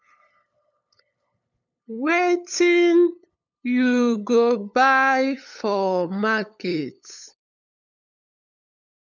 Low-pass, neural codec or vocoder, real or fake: 7.2 kHz; codec, 16 kHz, 8 kbps, FunCodec, trained on LibriTTS, 25 frames a second; fake